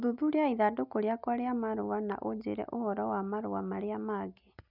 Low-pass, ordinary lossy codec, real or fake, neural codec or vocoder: 5.4 kHz; none; real; none